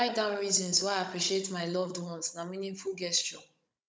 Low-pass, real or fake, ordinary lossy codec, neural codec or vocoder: none; fake; none; codec, 16 kHz, 16 kbps, FunCodec, trained on Chinese and English, 50 frames a second